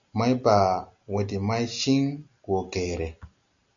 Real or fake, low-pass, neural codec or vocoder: real; 7.2 kHz; none